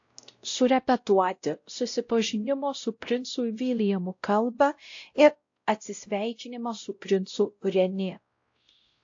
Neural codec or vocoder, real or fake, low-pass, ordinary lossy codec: codec, 16 kHz, 0.5 kbps, X-Codec, WavLM features, trained on Multilingual LibriSpeech; fake; 7.2 kHz; AAC, 48 kbps